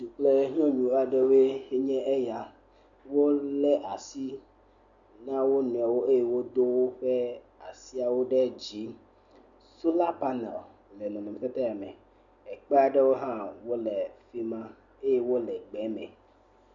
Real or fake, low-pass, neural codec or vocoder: real; 7.2 kHz; none